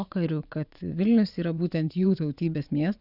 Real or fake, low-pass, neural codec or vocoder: fake; 5.4 kHz; vocoder, 22.05 kHz, 80 mel bands, WaveNeXt